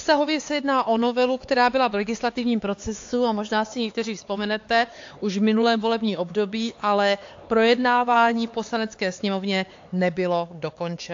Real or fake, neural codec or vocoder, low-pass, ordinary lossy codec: fake; codec, 16 kHz, 4 kbps, X-Codec, HuBERT features, trained on LibriSpeech; 7.2 kHz; AAC, 48 kbps